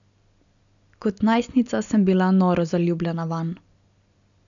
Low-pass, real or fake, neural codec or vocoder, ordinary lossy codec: 7.2 kHz; real; none; none